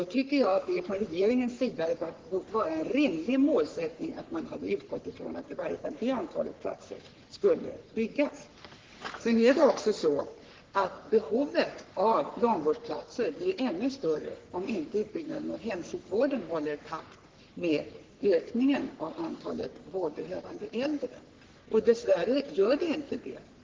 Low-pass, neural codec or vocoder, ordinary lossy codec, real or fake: 7.2 kHz; codec, 44.1 kHz, 3.4 kbps, Pupu-Codec; Opus, 16 kbps; fake